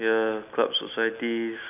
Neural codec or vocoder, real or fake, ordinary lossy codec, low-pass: none; real; none; 3.6 kHz